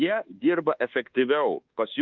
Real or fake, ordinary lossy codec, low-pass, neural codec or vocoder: fake; Opus, 24 kbps; 7.2 kHz; codec, 24 kHz, 1.2 kbps, DualCodec